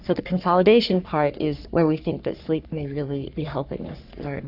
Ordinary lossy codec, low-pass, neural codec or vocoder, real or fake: AAC, 48 kbps; 5.4 kHz; codec, 44.1 kHz, 3.4 kbps, Pupu-Codec; fake